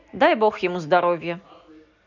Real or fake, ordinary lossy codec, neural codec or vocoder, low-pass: real; none; none; 7.2 kHz